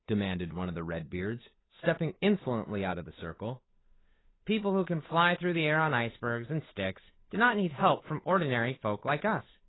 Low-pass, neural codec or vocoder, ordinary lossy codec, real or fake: 7.2 kHz; codec, 16 kHz, 8 kbps, FunCodec, trained on Chinese and English, 25 frames a second; AAC, 16 kbps; fake